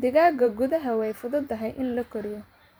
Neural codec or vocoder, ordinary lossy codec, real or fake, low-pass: vocoder, 44.1 kHz, 128 mel bands every 512 samples, BigVGAN v2; none; fake; none